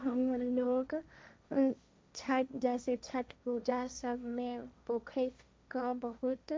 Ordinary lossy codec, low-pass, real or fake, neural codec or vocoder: none; none; fake; codec, 16 kHz, 1.1 kbps, Voila-Tokenizer